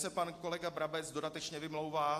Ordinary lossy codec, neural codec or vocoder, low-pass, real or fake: AAC, 64 kbps; none; 14.4 kHz; real